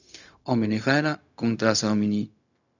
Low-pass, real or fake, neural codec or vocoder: 7.2 kHz; fake; codec, 16 kHz, 0.4 kbps, LongCat-Audio-Codec